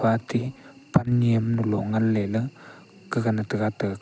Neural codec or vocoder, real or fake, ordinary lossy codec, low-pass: none; real; none; none